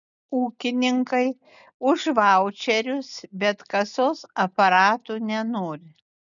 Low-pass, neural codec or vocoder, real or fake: 7.2 kHz; none; real